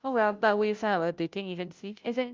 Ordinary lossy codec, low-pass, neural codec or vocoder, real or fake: Opus, 32 kbps; 7.2 kHz; codec, 16 kHz, 0.5 kbps, FunCodec, trained on Chinese and English, 25 frames a second; fake